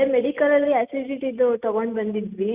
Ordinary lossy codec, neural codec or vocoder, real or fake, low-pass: Opus, 64 kbps; none; real; 3.6 kHz